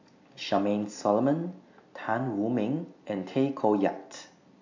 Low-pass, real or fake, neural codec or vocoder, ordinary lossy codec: 7.2 kHz; real; none; AAC, 48 kbps